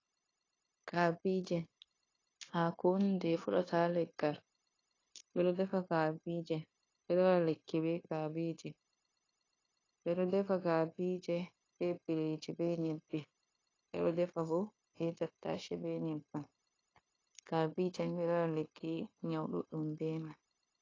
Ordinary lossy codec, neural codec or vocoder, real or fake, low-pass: AAC, 32 kbps; codec, 16 kHz, 0.9 kbps, LongCat-Audio-Codec; fake; 7.2 kHz